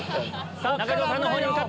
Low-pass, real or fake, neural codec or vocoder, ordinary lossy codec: none; real; none; none